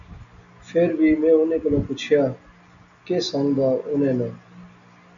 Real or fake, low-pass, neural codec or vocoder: real; 7.2 kHz; none